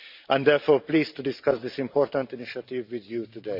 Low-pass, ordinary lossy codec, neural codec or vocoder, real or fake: 5.4 kHz; MP3, 48 kbps; vocoder, 44.1 kHz, 128 mel bands every 512 samples, BigVGAN v2; fake